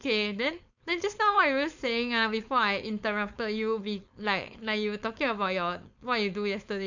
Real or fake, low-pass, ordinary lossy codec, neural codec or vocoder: fake; 7.2 kHz; none; codec, 16 kHz, 4.8 kbps, FACodec